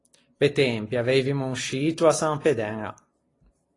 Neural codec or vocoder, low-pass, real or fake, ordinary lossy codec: none; 10.8 kHz; real; AAC, 32 kbps